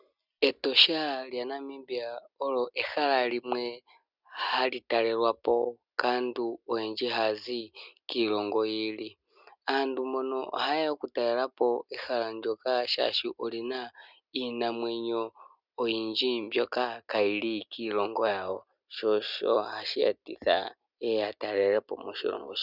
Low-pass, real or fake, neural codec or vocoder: 5.4 kHz; real; none